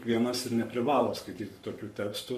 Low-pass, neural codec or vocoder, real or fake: 14.4 kHz; codec, 44.1 kHz, 7.8 kbps, Pupu-Codec; fake